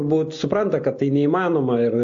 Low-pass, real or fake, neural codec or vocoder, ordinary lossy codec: 7.2 kHz; real; none; AAC, 48 kbps